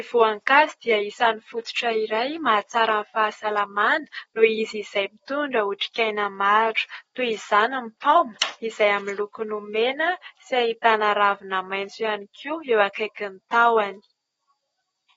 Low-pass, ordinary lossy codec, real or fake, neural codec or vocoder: 19.8 kHz; AAC, 24 kbps; real; none